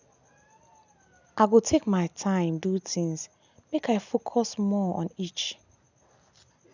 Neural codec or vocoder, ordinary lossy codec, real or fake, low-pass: none; none; real; 7.2 kHz